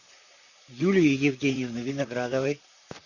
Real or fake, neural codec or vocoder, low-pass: fake; vocoder, 44.1 kHz, 128 mel bands, Pupu-Vocoder; 7.2 kHz